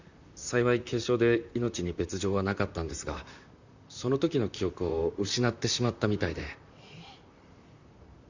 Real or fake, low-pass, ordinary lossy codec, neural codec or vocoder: fake; 7.2 kHz; Opus, 64 kbps; vocoder, 44.1 kHz, 128 mel bands, Pupu-Vocoder